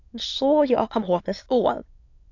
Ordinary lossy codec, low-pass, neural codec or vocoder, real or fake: AAC, 48 kbps; 7.2 kHz; autoencoder, 22.05 kHz, a latent of 192 numbers a frame, VITS, trained on many speakers; fake